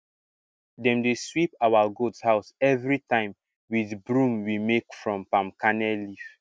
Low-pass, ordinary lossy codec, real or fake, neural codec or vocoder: none; none; real; none